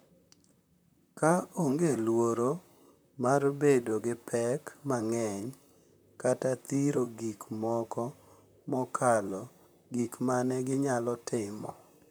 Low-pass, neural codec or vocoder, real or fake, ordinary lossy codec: none; vocoder, 44.1 kHz, 128 mel bands, Pupu-Vocoder; fake; none